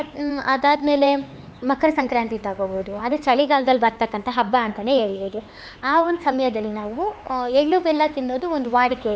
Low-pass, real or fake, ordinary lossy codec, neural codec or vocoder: none; fake; none; codec, 16 kHz, 4 kbps, X-Codec, HuBERT features, trained on LibriSpeech